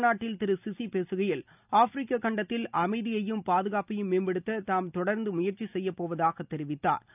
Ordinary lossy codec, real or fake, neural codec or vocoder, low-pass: none; real; none; 3.6 kHz